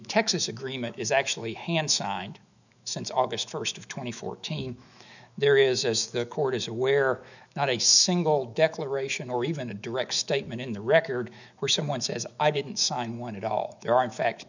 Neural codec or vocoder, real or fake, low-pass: autoencoder, 48 kHz, 128 numbers a frame, DAC-VAE, trained on Japanese speech; fake; 7.2 kHz